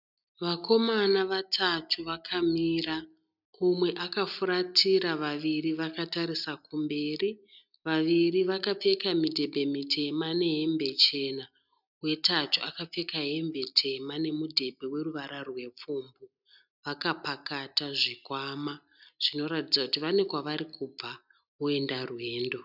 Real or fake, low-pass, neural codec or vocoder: real; 5.4 kHz; none